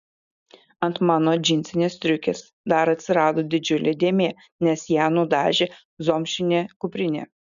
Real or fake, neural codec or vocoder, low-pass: real; none; 7.2 kHz